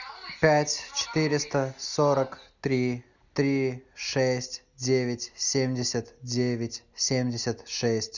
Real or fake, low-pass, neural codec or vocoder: real; 7.2 kHz; none